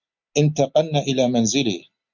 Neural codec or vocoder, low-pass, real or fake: none; 7.2 kHz; real